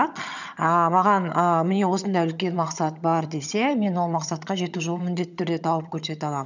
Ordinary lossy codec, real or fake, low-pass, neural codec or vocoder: none; fake; 7.2 kHz; vocoder, 22.05 kHz, 80 mel bands, HiFi-GAN